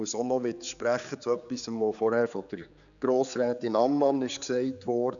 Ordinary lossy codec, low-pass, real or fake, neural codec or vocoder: none; 7.2 kHz; fake; codec, 16 kHz, 4 kbps, X-Codec, HuBERT features, trained on general audio